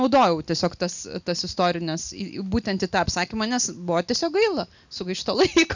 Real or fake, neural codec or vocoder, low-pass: real; none; 7.2 kHz